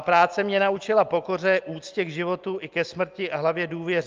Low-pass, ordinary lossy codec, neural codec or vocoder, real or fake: 7.2 kHz; Opus, 32 kbps; none; real